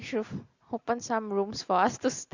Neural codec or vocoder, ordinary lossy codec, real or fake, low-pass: none; Opus, 64 kbps; real; 7.2 kHz